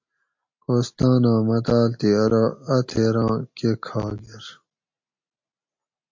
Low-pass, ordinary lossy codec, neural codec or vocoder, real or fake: 7.2 kHz; MP3, 48 kbps; none; real